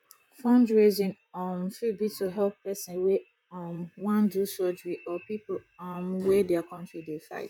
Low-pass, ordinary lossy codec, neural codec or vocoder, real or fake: 19.8 kHz; none; vocoder, 44.1 kHz, 128 mel bands, Pupu-Vocoder; fake